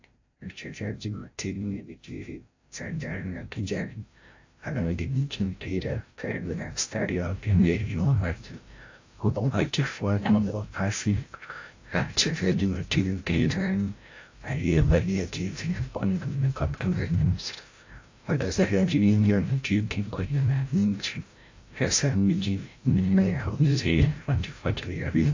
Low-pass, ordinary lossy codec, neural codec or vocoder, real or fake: 7.2 kHz; AAC, 48 kbps; codec, 16 kHz, 0.5 kbps, FreqCodec, larger model; fake